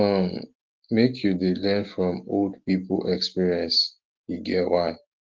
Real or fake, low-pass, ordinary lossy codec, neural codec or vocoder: real; 7.2 kHz; Opus, 16 kbps; none